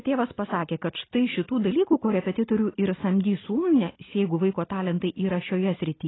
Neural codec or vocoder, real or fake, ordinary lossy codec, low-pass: none; real; AAC, 16 kbps; 7.2 kHz